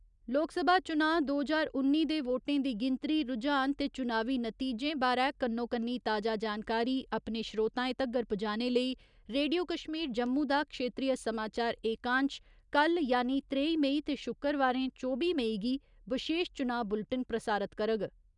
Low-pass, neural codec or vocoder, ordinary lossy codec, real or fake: 10.8 kHz; none; none; real